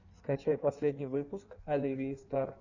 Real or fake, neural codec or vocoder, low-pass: fake; codec, 16 kHz in and 24 kHz out, 1.1 kbps, FireRedTTS-2 codec; 7.2 kHz